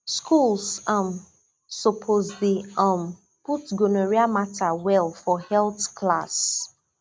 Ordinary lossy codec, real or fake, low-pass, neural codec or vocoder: none; real; none; none